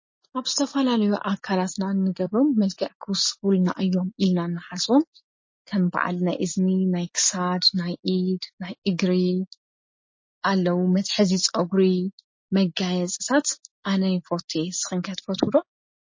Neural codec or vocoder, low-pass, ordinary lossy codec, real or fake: none; 7.2 kHz; MP3, 32 kbps; real